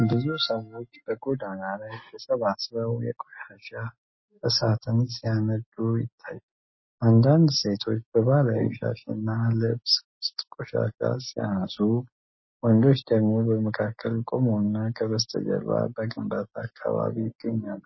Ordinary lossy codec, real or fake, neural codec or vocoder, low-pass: MP3, 24 kbps; real; none; 7.2 kHz